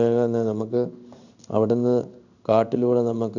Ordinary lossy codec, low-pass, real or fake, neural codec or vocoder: none; 7.2 kHz; fake; codec, 16 kHz in and 24 kHz out, 1 kbps, XY-Tokenizer